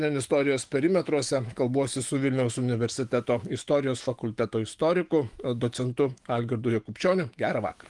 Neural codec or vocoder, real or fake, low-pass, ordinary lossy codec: autoencoder, 48 kHz, 128 numbers a frame, DAC-VAE, trained on Japanese speech; fake; 10.8 kHz; Opus, 24 kbps